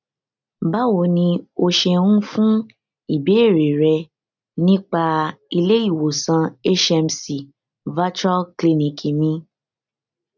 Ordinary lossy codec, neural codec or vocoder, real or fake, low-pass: none; none; real; 7.2 kHz